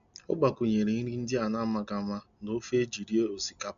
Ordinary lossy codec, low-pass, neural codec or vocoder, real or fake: MP3, 96 kbps; 7.2 kHz; none; real